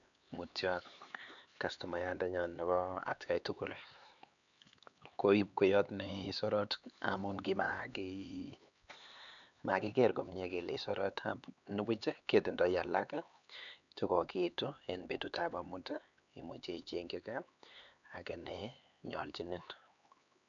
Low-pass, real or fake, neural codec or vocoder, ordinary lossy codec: 7.2 kHz; fake; codec, 16 kHz, 4 kbps, X-Codec, HuBERT features, trained on LibriSpeech; none